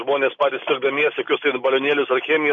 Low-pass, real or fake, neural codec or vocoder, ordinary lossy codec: 7.2 kHz; real; none; MP3, 96 kbps